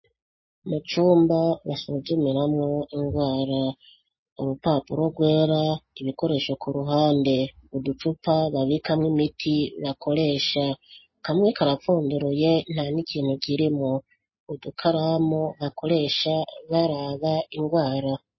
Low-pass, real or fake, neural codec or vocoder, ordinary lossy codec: 7.2 kHz; real; none; MP3, 24 kbps